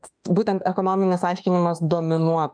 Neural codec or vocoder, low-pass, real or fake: autoencoder, 48 kHz, 32 numbers a frame, DAC-VAE, trained on Japanese speech; 9.9 kHz; fake